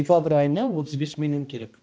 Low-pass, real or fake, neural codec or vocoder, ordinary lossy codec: none; fake; codec, 16 kHz, 1 kbps, X-Codec, HuBERT features, trained on balanced general audio; none